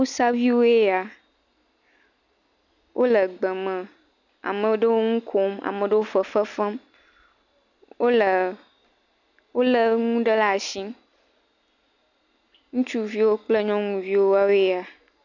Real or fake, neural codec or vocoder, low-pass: real; none; 7.2 kHz